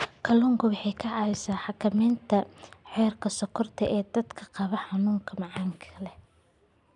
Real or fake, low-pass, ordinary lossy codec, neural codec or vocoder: real; 10.8 kHz; none; none